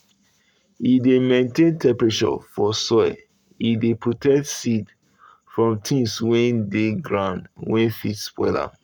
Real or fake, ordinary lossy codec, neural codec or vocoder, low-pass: fake; none; codec, 44.1 kHz, 7.8 kbps, Pupu-Codec; 19.8 kHz